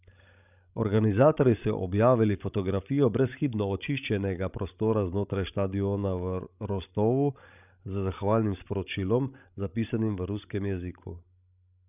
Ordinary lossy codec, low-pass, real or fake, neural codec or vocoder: none; 3.6 kHz; fake; codec, 16 kHz, 16 kbps, FreqCodec, larger model